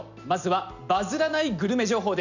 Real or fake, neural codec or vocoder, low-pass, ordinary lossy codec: real; none; 7.2 kHz; none